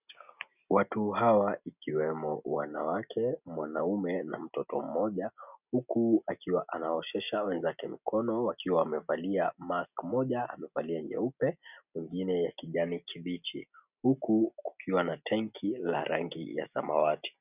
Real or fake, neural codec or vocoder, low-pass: real; none; 3.6 kHz